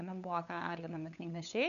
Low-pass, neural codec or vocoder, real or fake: 7.2 kHz; codec, 16 kHz, 8 kbps, FunCodec, trained on LibriTTS, 25 frames a second; fake